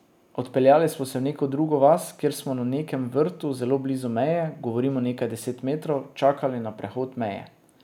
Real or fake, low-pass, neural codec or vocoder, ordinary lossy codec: real; 19.8 kHz; none; none